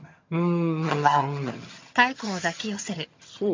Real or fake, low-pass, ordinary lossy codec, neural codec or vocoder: fake; 7.2 kHz; MP3, 48 kbps; vocoder, 22.05 kHz, 80 mel bands, HiFi-GAN